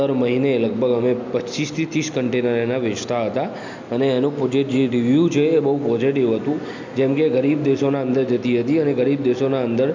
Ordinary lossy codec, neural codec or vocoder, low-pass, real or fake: MP3, 64 kbps; none; 7.2 kHz; real